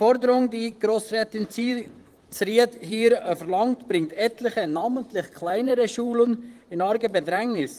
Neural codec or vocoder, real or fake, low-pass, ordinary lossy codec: vocoder, 44.1 kHz, 128 mel bands, Pupu-Vocoder; fake; 14.4 kHz; Opus, 24 kbps